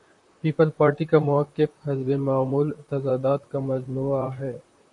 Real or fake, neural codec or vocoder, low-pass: fake; vocoder, 44.1 kHz, 128 mel bands, Pupu-Vocoder; 10.8 kHz